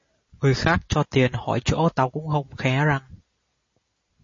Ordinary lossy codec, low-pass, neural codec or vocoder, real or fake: MP3, 32 kbps; 7.2 kHz; none; real